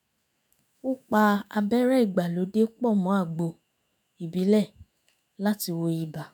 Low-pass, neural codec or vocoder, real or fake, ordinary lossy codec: none; autoencoder, 48 kHz, 128 numbers a frame, DAC-VAE, trained on Japanese speech; fake; none